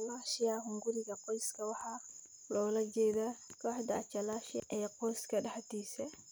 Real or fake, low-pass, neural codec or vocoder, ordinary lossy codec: real; none; none; none